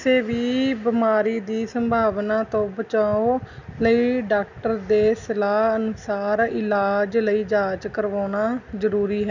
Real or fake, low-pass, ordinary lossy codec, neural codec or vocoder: real; 7.2 kHz; none; none